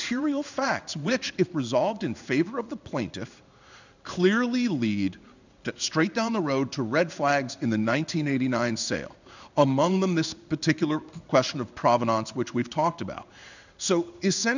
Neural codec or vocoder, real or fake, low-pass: codec, 16 kHz in and 24 kHz out, 1 kbps, XY-Tokenizer; fake; 7.2 kHz